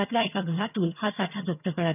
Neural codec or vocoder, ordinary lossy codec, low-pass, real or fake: vocoder, 22.05 kHz, 80 mel bands, HiFi-GAN; none; 3.6 kHz; fake